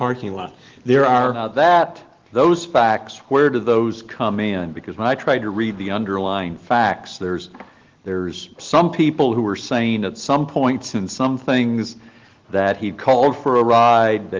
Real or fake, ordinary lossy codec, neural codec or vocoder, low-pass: real; Opus, 16 kbps; none; 7.2 kHz